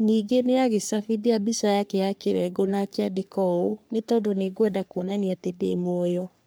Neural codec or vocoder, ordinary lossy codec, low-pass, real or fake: codec, 44.1 kHz, 3.4 kbps, Pupu-Codec; none; none; fake